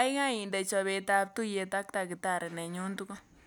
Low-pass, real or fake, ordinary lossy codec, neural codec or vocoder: none; real; none; none